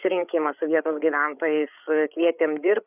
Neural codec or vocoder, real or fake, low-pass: codec, 16 kHz, 8 kbps, FreqCodec, larger model; fake; 3.6 kHz